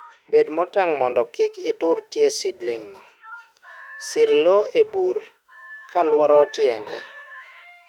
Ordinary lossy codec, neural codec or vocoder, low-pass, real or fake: none; autoencoder, 48 kHz, 32 numbers a frame, DAC-VAE, trained on Japanese speech; 19.8 kHz; fake